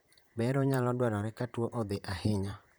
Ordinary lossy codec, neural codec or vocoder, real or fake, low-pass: none; vocoder, 44.1 kHz, 128 mel bands, Pupu-Vocoder; fake; none